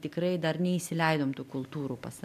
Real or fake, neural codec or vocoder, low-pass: real; none; 14.4 kHz